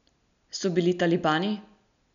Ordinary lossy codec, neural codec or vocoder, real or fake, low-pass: none; none; real; 7.2 kHz